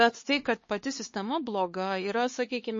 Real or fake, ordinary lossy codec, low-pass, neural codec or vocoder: fake; MP3, 32 kbps; 7.2 kHz; codec, 16 kHz, 4 kbps, X-Codec, HuBERT features, trained on LibriSpeech